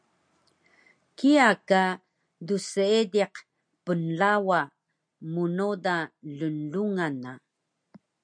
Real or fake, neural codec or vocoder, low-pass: real; none; 9.9 kHz